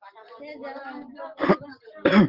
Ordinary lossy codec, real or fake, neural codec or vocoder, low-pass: Opus, 16 kbps; real; none; 5.4 kHz